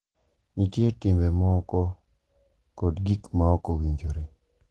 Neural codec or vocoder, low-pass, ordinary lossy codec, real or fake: none; 10.8 kHz; Opus, 16 kbps; real